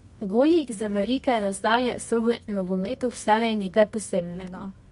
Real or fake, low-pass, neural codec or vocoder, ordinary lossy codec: fake; 10.8 kHz; codec, 24 kHz, 0.9 kbps, WavTokenizer, medium music audio release; MP3, 64 kbps